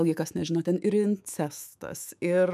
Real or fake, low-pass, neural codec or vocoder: fake; 14.4 kHz; autoencoder, 48 kHz, 128 numbers a frame, DAC-VAE, trained on Japanese speech